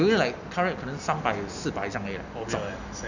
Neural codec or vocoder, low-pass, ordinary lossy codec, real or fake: none; 7.2 kHz; none; real